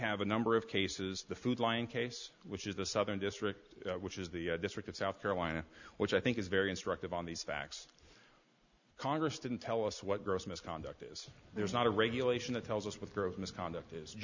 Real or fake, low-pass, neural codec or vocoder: real; 7.2 kHz; none